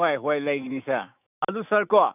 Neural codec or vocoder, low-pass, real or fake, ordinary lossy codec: autoencoder, 48 kHz, 128 numbers a frame, DAC-VAE, trained on Japanese speech; 3.6 kHz; fake; none